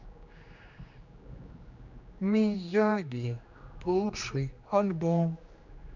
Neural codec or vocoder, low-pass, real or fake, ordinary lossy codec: codec, 16 kHz, 2 kbps, X-Codec, HuBERT features, trained on general audio; 7.2 kHz; fake; Opus, 64 kbps